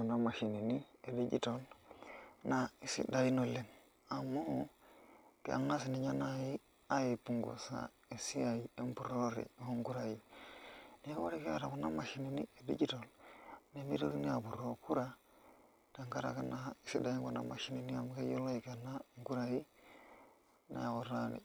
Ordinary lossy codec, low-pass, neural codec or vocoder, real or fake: none; none; none; real